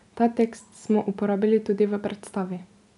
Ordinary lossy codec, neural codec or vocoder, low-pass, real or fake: none; none; 10.8 kHz; real